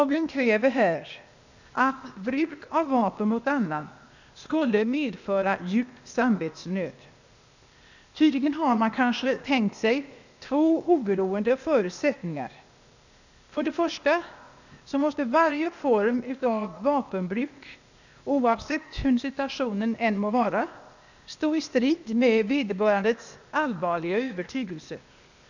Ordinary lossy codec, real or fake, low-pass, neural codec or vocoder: none; fake; 7.2 kHz; codec, 16 kHz, 0.8 kbps, ZipCodec